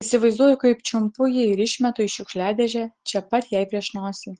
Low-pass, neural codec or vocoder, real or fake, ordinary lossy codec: 9.9 kHz; none; real; Opus, 16 kbps